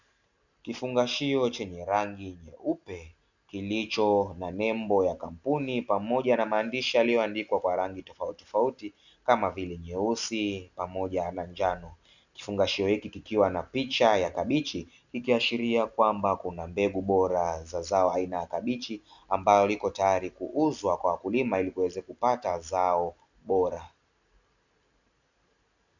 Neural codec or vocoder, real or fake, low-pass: none; real; 7.2 kHz